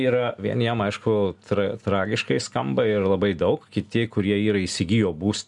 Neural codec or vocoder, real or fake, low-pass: none; real; 10.8 kHz